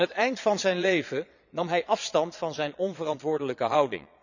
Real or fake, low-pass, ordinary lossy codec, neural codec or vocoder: fake; 7.2 kHz; none; vocoder, 22.05 kHz, 80 mel bands, Vocos